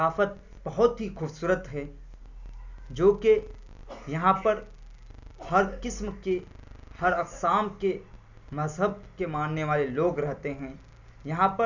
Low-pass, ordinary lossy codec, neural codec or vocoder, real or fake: 7.2 kHz; none; none; real